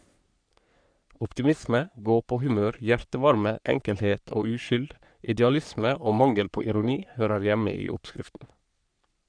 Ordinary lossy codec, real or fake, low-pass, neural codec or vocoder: none; fake; 9.9 kHz; codec, 44.1 kHz, 3.4 kbps, Pupu-Codec